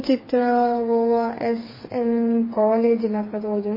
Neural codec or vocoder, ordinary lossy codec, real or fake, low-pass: codec, 16 kHz, 4 kbps, FreqCodec, smaller model; MP3, 24 kbps; fake; 5.4 kHz